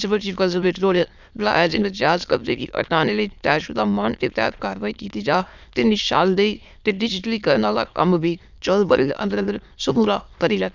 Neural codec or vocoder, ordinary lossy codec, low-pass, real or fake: autoencoder, 22.05 kHz, a latent of 192 numbers a frame, VITS, trained on many speakers; none; 7.2 kHz; fake